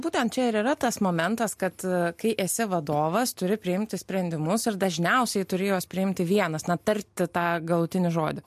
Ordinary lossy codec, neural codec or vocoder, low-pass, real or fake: MP3, 64 kbps; none; 14.4 kHz; real